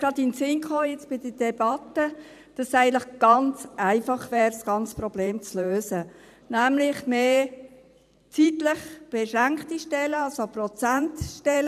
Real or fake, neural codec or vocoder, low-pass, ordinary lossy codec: fake; vocoder, 44.1 kHz, 128 mel bands every 512 samples, BigVGAN v2; 14.4 kHz; none